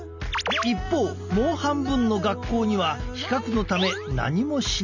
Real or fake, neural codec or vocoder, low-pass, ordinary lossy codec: real; none; 7.2 kHz; none